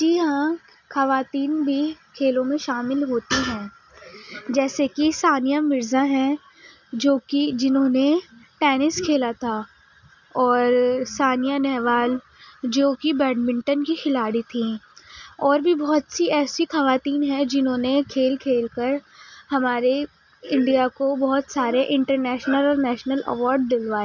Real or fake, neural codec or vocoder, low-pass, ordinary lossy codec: real; none; 7.2 kHz; none